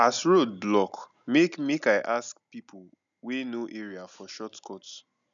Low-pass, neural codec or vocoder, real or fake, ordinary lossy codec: 7.2 kHz; none; real; none